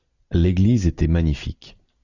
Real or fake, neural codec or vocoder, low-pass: real; none; 7.2 kHz